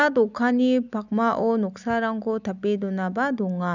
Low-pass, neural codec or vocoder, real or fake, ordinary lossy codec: 7.2 kHz; none; real; none